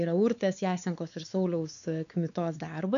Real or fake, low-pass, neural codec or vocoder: fake; 7.2 kHz; codec, 16 kHz, 4 kbps, X-Codec, WavLM features, trained on Multilingual LibriSpeech